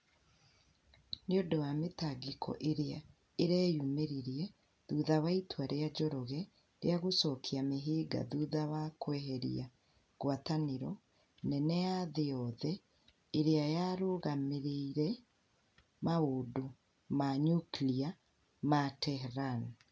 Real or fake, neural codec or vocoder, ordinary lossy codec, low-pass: real; none; none; none